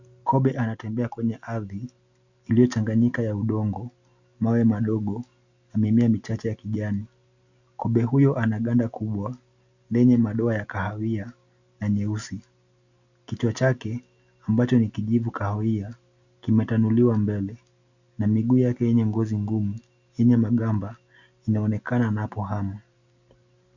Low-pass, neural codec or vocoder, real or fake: 7.2 kHz; none; real